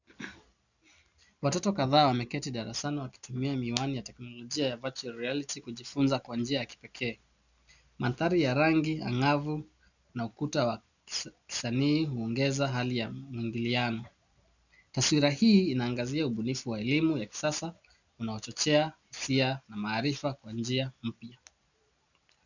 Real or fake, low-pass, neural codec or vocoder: real; 7.2 kHz; none